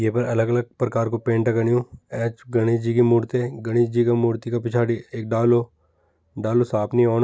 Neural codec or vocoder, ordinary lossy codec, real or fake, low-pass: none; none; real; none